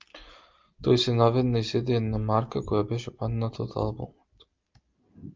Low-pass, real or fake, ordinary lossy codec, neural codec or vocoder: 7.2 kHz; real; Opus, 24 kbps; none